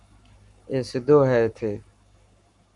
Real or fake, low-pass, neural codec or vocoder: fake; 10.8 kHz; codec, 44.1 kHz, 7.8 kbps, Pupu-Codec